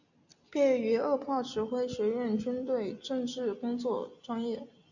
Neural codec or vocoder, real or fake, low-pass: none; real; 7.2 kHz